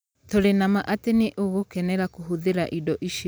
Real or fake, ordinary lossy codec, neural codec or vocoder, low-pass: real; none; none; none